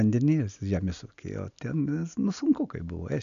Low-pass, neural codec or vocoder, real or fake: 7.2 kHz; none; real